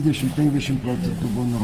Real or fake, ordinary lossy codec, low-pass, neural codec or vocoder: real; Opus, 24 kbps; 14.4 kHz; none